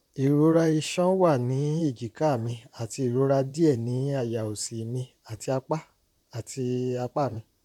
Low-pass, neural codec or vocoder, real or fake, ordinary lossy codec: 19.8 kHz; vocoder, 44.1 kHz, 128 mel bands, Pupu-Vocoder; fake; none